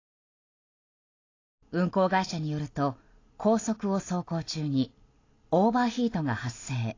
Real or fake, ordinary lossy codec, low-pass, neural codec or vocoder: real; AAC, 32 kbps; 7.2 kHz; none